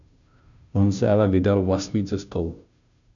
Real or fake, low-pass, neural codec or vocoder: fake; 7.2 kHz; codec, 16 kHz, 0.5 kbps, FunCodec, trained on Chinese and English, 25 frames a second